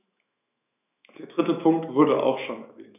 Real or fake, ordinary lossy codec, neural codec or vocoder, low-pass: fake; none; autoencoder, 48 kHz, 128 numbers a frame, DAC-VAE, trained on Japanese speech; 3.6 kHz